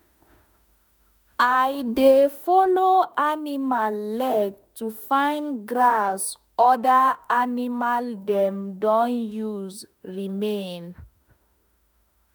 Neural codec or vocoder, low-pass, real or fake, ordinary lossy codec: autoencoder, 48 kHz, 32 numbers a frame, DAC-VAE, trained on Japanese speech; none; fake; none